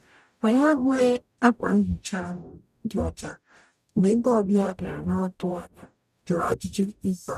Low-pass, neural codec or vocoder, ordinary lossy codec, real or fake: 14.4 kHz; codec, 44.1 kHz, 0.9 kbps, DAC; none; fake